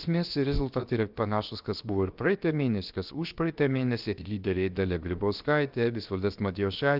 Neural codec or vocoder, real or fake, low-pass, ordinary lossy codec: codec, 16 kHz, 0.7 kbps, FocalCodec; fake; 5.4 kHz; Opus, 24 kbps